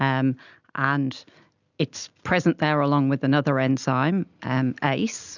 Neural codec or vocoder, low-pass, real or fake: none; 7.2 kHz; real